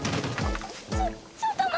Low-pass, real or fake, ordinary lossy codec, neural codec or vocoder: none; real; none; none